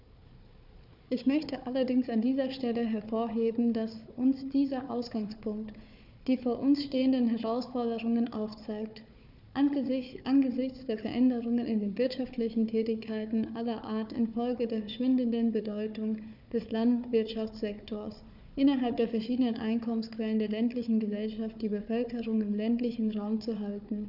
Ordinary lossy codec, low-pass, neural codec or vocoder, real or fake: none; 5.4 kHz; codec, 16 kHz, 4 kbps, FunCodec, trained on Chinese and English, 50 frames a second; fake